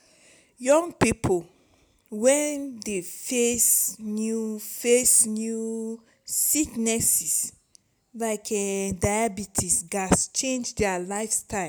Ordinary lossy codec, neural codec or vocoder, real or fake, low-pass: none; none; real; none